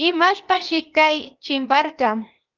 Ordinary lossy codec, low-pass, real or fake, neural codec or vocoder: Opus, 24 kbps; 7.2 kHz; fake; codec, 16 kHz, 0.8 kbps, ZipCodec